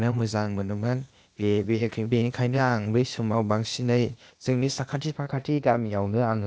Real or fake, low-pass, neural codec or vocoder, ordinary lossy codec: fake; none; codec, 16 kHz, 0.8 kbps, ZipCodec; none